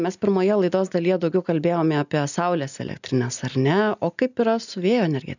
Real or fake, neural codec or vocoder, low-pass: real; none; 7.2 kHz